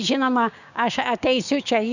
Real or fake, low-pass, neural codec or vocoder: fake; 7.2 kHz; codec, 16 kHz, 6 kbps, DAC